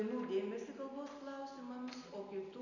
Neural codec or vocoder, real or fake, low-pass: none; real; 7.2 kHz